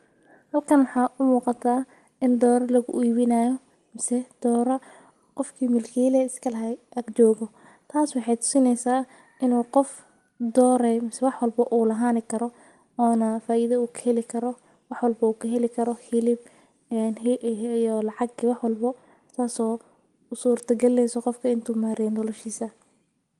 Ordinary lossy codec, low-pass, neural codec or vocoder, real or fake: Opus, 24 kbps; 10.8 kHz; none; real